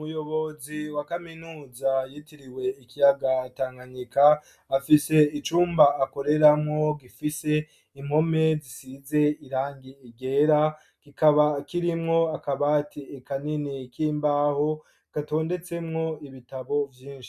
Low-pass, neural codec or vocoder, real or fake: 14.4 kHz; none; real